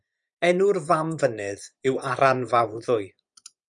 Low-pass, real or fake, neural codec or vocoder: 10.8 kHz; fake; vocoder, 24 kHz, 100 mel bands, Vocos